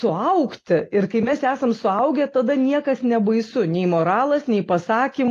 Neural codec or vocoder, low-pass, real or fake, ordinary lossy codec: none; 14.4 kHz; real; AAC, 48 kbps